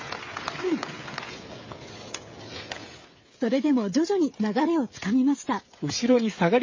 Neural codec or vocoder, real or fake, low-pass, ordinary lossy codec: codec, 16 kHz, 16 kbps, FreqCodec, smaller model; fake; 7.2 kHz; MP3, 32 kbps